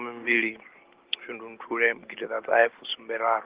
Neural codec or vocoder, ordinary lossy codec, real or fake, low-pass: none; Opus, 16 kbps; real; 3.6 kHz